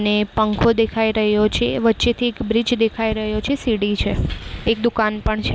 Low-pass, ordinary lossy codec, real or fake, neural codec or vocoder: none; none; real; none